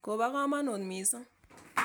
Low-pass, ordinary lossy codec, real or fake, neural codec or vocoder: none; none; real; none